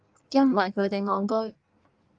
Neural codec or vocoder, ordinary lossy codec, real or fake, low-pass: codec, 16 kHz in and 24 kHz out, 1.1 kbps, FireRedTTS-2 codec; Opus, 24 kbps; fake; 9.9 kHz